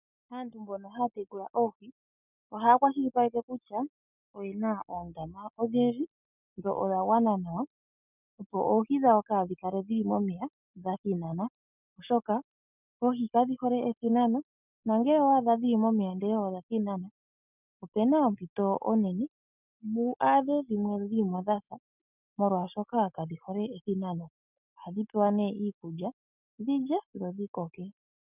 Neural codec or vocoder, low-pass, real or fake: none; 3.6 kHz; real